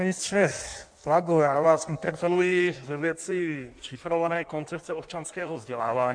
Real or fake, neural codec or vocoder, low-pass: fake; codec, 16 kHz in and 24 kHz out, 1.1 kbps, FireRedTTS-2 codec; 9.9 kHz